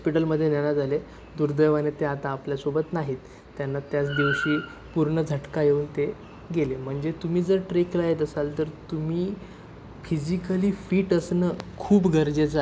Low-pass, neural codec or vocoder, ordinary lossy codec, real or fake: none; none; none; real